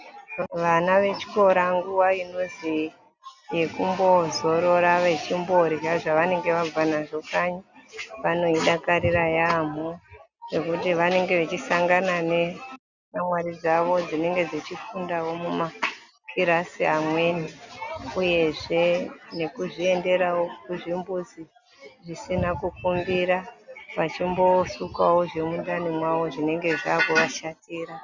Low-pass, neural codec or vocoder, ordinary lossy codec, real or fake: 7.2 kHz; none; Opus, 64 kbps; real